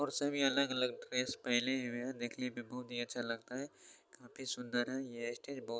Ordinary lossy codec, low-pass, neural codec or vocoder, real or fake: none; none; none; real